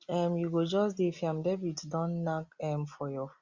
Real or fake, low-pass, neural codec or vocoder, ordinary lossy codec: real; 7.2 kHz; none; Opus, 64 kbps